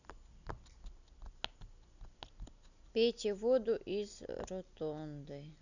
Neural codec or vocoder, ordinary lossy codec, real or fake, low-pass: none; none; real; 7.2 kHz